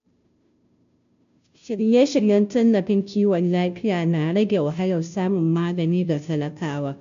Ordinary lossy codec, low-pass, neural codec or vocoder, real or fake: MP3, 64 kbps; 7.2 kHz; codec, 16 kHz, 0.5 kbps, FunCodec, trained on Chinese and English, 25 frames a second; fake